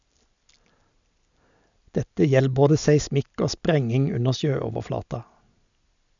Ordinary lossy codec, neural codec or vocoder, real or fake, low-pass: none; none; real; 7.2 kHz